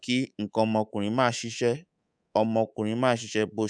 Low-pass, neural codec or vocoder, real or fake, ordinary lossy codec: 9.9 kHz; codec, 24 kHz, 3.1 kbps, DualCodec; fake; none